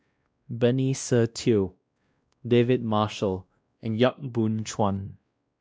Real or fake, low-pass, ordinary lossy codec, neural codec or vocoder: fake; none; none; codec, 16 kHz, 1 kbps, X-Codec, WavLM features, trained on Multilingual LibriSpeech